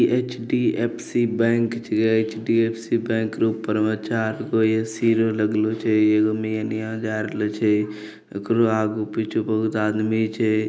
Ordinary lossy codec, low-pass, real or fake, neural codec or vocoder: none; none; real; none